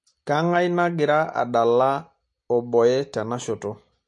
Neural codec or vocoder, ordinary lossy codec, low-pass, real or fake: none; MP3, 48 kbps; 10.8 kHz; real